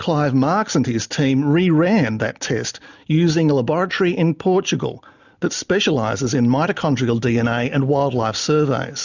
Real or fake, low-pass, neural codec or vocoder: real; 7.2 kHz; none